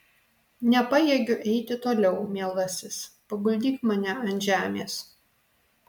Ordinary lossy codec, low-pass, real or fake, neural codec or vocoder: MP3, 96 kbps; 19.8 kHz; real; none